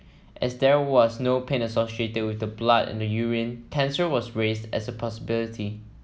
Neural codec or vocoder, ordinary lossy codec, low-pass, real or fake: none; none; none; real